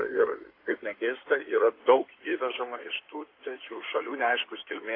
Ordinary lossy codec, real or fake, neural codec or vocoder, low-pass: AAC, 24 kbps; fake; codec, 16 kHz in and 24 kHz out, 2.2 kbps, FireRedTTS-2 codec; 5.4 kHz